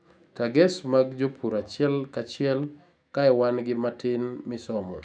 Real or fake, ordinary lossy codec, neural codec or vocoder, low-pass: fake; none; autoencoder, 48 kHz, 128 numbers a frame, DAC-VAE, trained on Japanese speech; 9.9 kHz